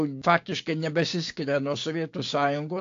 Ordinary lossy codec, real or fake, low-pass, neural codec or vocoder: AAC, 48 kbps; fake; 7.2 kHz; codec, 16 kHz, 6 kbps, DAC